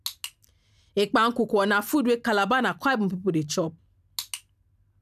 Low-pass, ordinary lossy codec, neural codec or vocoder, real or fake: 14.4 kHz; none; none; real